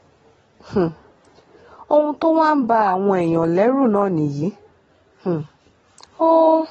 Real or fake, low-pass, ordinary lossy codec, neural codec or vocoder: real; 19.8 kHz; AAC, 24 kbps; none